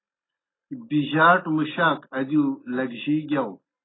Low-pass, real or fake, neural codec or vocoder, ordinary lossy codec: 7.2 kHz; real; none; AAC, 16 kbps